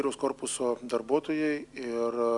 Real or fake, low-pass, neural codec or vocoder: real; 10.8 kHz; none